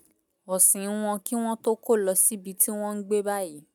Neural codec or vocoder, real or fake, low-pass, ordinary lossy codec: none; real; none; none